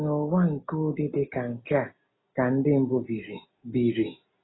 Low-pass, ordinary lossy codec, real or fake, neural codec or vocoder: 7.2 kHz; AAC, 16 kbps; real; none